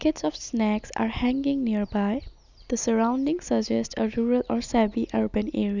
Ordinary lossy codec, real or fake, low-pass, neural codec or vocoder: none; real; 7.2 kHz; none